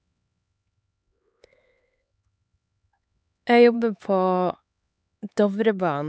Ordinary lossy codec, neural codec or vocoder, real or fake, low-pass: none; codec, 16 kHz, 4 kbps, X-Codec, HuBERT features, trained on LibriSpeech; fake; none